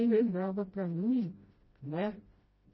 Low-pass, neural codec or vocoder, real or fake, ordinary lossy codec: 7.2 kHz; codec, 16 kHz, 0.5 kbps, FreqCodec, smaller model; fake; MP3, 24 kbps